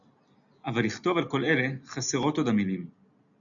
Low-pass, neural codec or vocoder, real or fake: 7.2 kHz; none; real